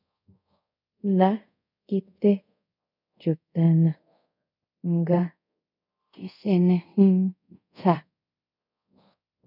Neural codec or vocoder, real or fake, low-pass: codec, 24 kHz, 0.5 kbps, DualCodec; fake; 5.4 kHz